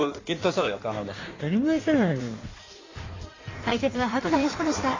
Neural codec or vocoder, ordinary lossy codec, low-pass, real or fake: codec, 16 kHz in and 24 kHz out, 1.1 kbps, FireRedTTS-2 codec; AAC, 32 kbps; 7.2 kHz; fake